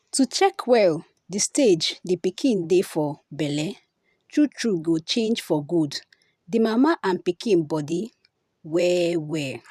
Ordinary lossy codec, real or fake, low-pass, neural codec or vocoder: none; fake; 14.4 kHz; vocoder, 44.1 kHz, 128 mel bands every 512 samples, BigVGAN v2